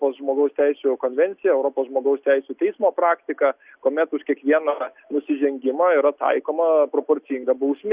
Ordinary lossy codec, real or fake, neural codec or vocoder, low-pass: Opus, 64 kbps; real; none; 3.6 kHz